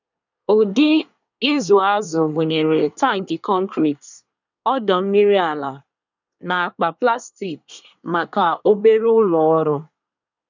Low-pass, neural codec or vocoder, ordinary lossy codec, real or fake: 7.2 kHz; codec, 24 kHz, 1 kbps, SNAC; none; fake